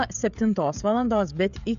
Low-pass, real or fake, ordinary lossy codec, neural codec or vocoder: 7.2 kHz; fake; AAC, 96 kbps; codec, 16 kHz, 16 kbps, FreqCodec, smaller model